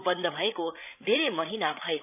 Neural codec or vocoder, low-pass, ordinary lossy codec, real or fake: codec, 16 kHz, 8 kbps, FreqCodec, larger model; 3.6 kHz; none; fake